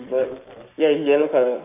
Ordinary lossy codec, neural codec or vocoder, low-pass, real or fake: none; codec, 44.1 kHz, 7.8 kbps, Pupu-Codec; 3.6 kHz; fake